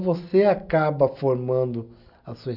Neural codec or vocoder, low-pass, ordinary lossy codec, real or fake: none; 5.4 kHz; none; real